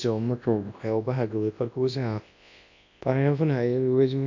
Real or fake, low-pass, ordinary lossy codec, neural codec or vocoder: fake; 7.2 kHz; MP3, 64 kbps; codec, 24 kHz, 0.9 kbps, WavTokenizer, large speech release